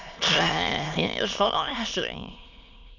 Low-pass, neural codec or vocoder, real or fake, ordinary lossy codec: 7.2 kHz; autoencoder, 22.05 kHz, a latent of 192 numbers a frame, VITS, trained on many speakers; fake; none